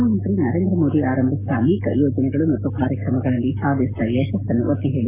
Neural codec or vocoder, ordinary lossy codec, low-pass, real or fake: codec, 44.1 kHz, 7.8 kbps, Pupu-Codec; AAC, 32 kbps; 3.6 kHz; fake